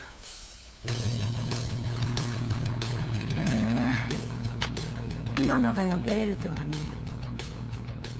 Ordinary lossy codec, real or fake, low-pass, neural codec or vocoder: none; fake; none; codec, 16 kHz, 2 kbps, FunCodec, trained on LibriTTS, 25 frames a second